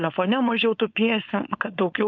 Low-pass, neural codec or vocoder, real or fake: 7.2 kHz; codec, 16 kHz, 4.8 kbps, FACodec; fake